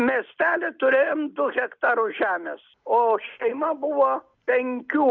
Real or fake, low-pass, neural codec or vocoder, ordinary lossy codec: real; 7.2 kHz; none; Opus, 64 kbps